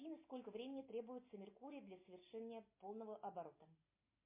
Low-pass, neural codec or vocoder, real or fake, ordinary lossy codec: 3.6 kHz; none; real; MP3, 24 kbps